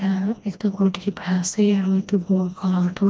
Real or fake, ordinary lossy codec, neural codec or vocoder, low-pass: fake; none; codec, 16 kHz, 1 kbps, FreqCodec, smaller model; none